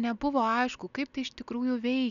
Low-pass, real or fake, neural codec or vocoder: 7.2 kHz; real; none